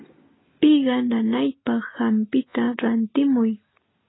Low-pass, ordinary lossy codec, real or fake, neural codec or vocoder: 7.2 kHz; AAC, 16 kbps; real; none